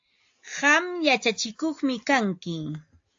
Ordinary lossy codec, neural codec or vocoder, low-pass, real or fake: AAC, 48 kbps; none; 7.2 kHz; real